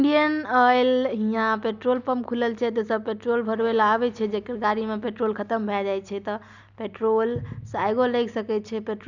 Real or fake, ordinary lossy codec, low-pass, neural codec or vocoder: real; none; 7.2 kHz; none